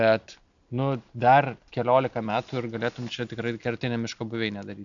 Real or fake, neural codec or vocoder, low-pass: real; none; 7.2 kHz